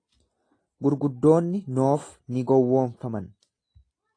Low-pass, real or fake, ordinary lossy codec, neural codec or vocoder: 9.9 kHz; real; AAC, 32 kbps; none